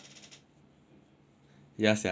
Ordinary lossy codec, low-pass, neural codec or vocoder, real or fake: none; none; none; real